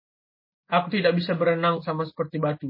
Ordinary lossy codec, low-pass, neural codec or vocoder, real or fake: MP3, 24 kbps; 5.4 kHz; none; real